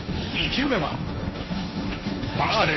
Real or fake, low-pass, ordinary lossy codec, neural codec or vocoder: fake; 7.2 kHz; MP3, 24 kbps; codec, 16 kHz, 1.1 kbps, Voila-Tokenizer